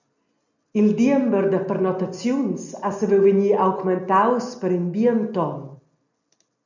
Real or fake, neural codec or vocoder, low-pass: real; none; 7.2 kHz